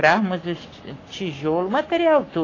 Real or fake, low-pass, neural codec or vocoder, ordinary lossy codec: fake; 7.2 kHz; codec, 44.1 kHz, 7.8 kbps, Pupu-Codec; AAC, 32 kbps